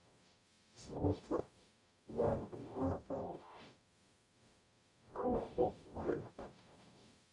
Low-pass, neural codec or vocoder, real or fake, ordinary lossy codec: 10.8 kHz; codec, 44.1 kHz, 0.9 kbps, DAC; fake; none